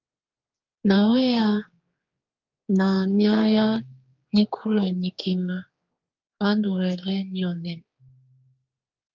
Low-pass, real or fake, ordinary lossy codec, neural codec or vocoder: 7.2 kHz; fake; Opus, 24 kbps; codec, 16 kHz, 4 kbps, X-Codec, HuBERT features, trained on general audio